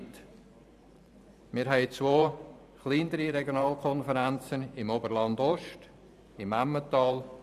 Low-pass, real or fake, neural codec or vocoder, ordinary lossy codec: 14.4 kHz; fake; vocoder, 44.1 kHz, 128 mel bands every 512 samples, BigVGAN v2; none